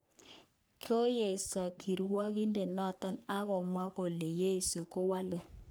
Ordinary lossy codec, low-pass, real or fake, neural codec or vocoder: none; none; fake; codec, 44.1 kHz, 3.4 kbps, Pupu-Codec